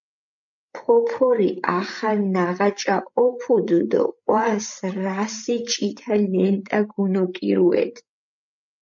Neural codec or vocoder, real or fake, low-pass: codec, 16 kHz, 8 kbps, FreqCodec, larger model; fake; 7.2 kHz